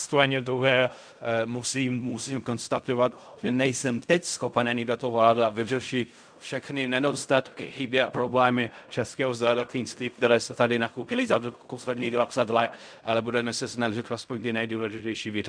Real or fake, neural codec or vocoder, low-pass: fake; codec, 16 kHz in and 24 kHz out, 0.4 kbps, LongCat-Audio-Codec, fine tuned four codebook decoder; 9.9 kHz